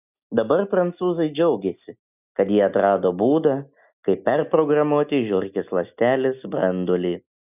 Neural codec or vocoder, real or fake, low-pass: none; real; 3.6 kHz